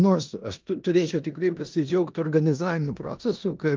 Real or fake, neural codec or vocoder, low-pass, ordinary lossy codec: fake; codec, 16 kHz in and 24 kHz out, 0.9 kbps, LongCat-Audio-Codec, four codebook decoder; 7.2 kHz; Opus, 24 kbps